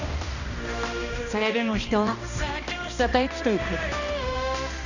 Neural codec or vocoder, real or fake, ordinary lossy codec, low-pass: codec, 16 kHz, 1 kbps, X-Codec, HuBERT features, trained on balanced general audio; fake; none; 7.2 kHz